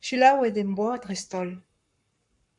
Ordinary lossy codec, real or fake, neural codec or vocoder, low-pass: MP3, 96 kbps; fake; codec, 44.1 kHz, 7.8 kbps, Pupu-Codec; 10.8 kHz